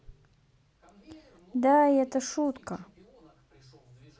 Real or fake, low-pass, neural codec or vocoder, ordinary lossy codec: real; none; none; none